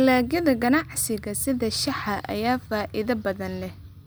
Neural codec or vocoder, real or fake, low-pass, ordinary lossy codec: none; real; none; none